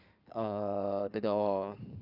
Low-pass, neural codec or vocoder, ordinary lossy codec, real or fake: 5.4 kHz; codec, 16 kHz in and 24 kHz out, 2.2 kbps, FireRedTTS-2 codec; none; fake